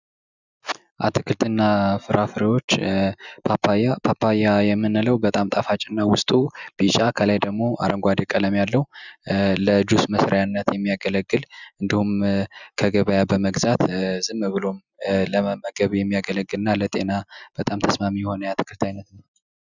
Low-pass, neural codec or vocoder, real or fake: 7.2 kHz; none; real